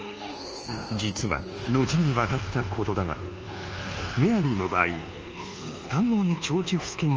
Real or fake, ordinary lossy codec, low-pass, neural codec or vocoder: fake; Opus, 24 kbps; 7.2 kHz; codec, 24 kHz, 1.2 kbps, DualCodec